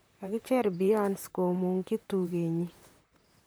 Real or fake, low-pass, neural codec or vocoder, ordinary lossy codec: fake; none; vocoder, 44.1 kHz, 128 mel bands, Pupu-Vocoder; none